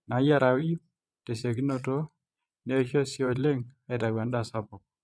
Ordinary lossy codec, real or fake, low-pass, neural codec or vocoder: none; fake; none; vocoder, 22.05 kHz, 80 mel bands, Vocos